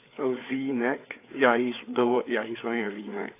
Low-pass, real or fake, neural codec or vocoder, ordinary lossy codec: 3.6 kHz; fake; codec, 16 kHz, 4 kbps, FreqCodec, larger model; AAC, 24 kbps